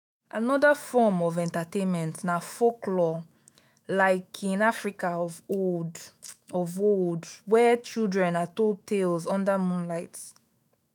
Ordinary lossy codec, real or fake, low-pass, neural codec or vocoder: none; fake; none; autoencoder, 48 kHz, 128 numbers a frame, DAC-VAE, trained on Japanese speech